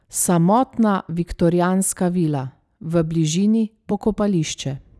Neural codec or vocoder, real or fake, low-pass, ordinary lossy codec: none; real; none; none